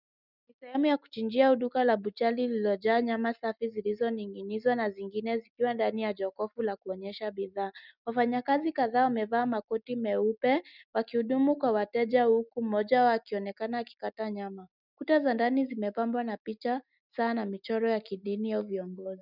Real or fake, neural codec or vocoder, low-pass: real; none; 5.4 kHz